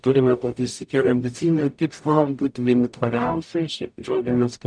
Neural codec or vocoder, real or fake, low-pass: codec, 44.1 kHz, 0.9 kbps, DAC; fake; 9.9 kHz